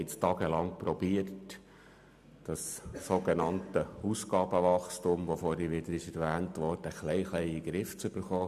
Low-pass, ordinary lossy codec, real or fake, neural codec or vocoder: 14.4 kHz; AAC, 96 kbps; real; none